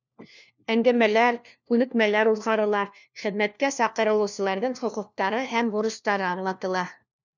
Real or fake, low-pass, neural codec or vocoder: fake; 7.2 kHz; codec, 16 kHz, 1 kbps, FunCodec, trained on LibriTTS, 50 frames a second